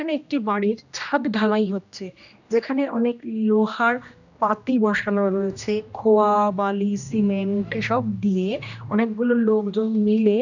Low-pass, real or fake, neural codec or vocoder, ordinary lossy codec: 7.2 kHz; fake; codec, 16 kHz, 1 kbps, X-Codec, HuBERT features, trained on general audio; none